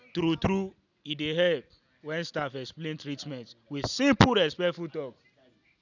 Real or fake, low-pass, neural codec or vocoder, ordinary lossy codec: real; 7.2 kHz; none; none